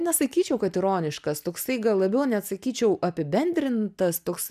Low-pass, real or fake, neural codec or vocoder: 14.4 kHz; real; none